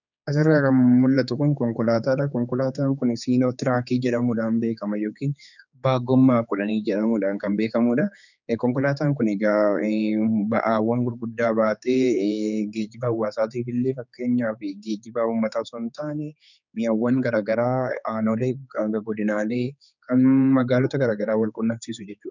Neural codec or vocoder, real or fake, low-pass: codec, 16 kHz, 4 kbps, X-Codec, HuBERT features, trained on general audio; fake; 7.2 kHz